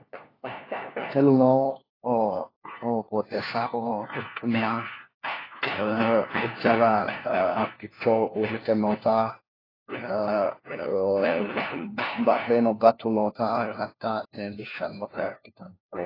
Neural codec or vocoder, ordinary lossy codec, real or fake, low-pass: codec, 16 kHz, 1 kbps, FunCodec, trained on LibriTTS, 50 frames a second; AAC, 24 kbps; fake; 5.4 kHz